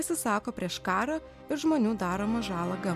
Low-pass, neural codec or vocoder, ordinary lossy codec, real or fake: 14.4 kHz; none; MP3, 96 kbps; real